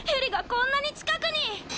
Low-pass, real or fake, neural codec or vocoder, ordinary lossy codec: none; real; none; none